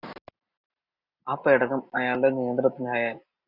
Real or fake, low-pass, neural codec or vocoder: real; 5.4 kHz; none